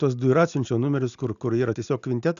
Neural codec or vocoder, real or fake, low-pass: none; real; 7.2 kHz